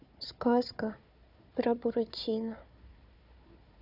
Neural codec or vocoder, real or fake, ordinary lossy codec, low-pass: codec, 16 kHz, 4 kbps, FunCodec, trained on Chinese and English, 50 frames a second; fake; none; 5.4 kHz